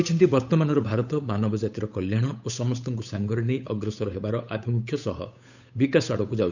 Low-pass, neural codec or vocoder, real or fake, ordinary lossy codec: 7.2 kHz; codec, 16 kHz, 8 kbps, FunCodec, trained on Chinese and English, 25 frames a second; fake; none